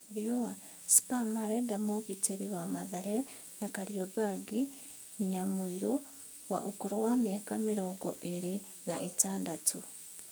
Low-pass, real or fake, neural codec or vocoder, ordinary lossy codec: none; fake; codec, 44.1 kHz, 2.6 kbps, SNAC; none